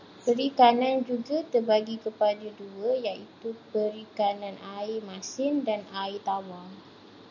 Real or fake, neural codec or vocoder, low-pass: real; none; 7.2 kHz